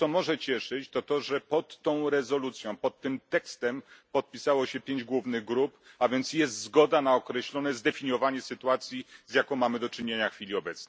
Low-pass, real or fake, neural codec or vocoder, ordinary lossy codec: none; real; none; none